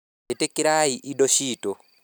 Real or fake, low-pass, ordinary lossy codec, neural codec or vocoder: real; none; none; none